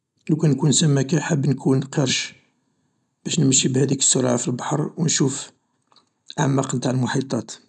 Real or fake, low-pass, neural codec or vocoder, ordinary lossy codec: real; none; none; none